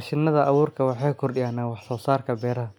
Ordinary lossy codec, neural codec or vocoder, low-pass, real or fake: none; none; 19.8 kHz; real